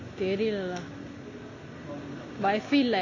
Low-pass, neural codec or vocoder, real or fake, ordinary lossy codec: 7.2 kHz; none; real; AAC, 32 kbps